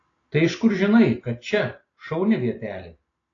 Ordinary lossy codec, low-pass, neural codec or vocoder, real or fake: AAC, 32 kbps; 7.2 kHz; none; real